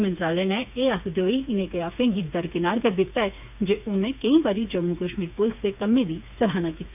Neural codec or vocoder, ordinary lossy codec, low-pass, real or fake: codec, 16 kHz, 4 kbps, FreqCodec, smaller model; none; 3.6 kHz; fake